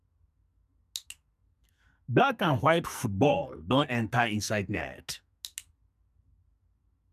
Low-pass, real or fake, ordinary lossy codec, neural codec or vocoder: 14.4 kHz; fake; none; codec, 44.1 kHz, 2.6 kbps, SNAC